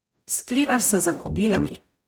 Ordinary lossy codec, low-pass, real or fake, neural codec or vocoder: none; none; fake; codec, 44.1 kHz, 0.9 kbps, DAC